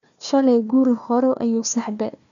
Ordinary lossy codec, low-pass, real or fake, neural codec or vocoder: none; 7.2 kHz; fake; codec, 16 kHz, 1 kbps, FunCodec, trained on Chinese and English, 50 frames a second